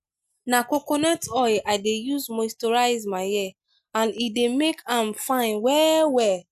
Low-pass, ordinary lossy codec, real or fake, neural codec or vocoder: 14.4 kHz; none; real; none